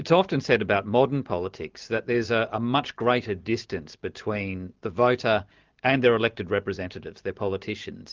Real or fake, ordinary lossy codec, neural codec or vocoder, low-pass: real; Opus, 32 kbps; none; 7.2 kHz